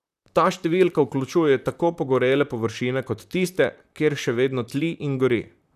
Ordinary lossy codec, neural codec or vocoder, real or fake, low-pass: none; vocoder, 44.1 kHz, 128 mel bands, Pupu-Vocoder; fake; 14.4 kHz